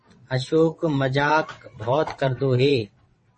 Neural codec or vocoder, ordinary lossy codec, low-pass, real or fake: vocoder, 22.05 kHz, 80 mel bands, WaveNeXt; MP3, 32 kbps; 9.9 kHz; fake